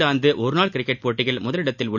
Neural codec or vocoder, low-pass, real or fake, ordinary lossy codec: none; 7.2 kHz; real; none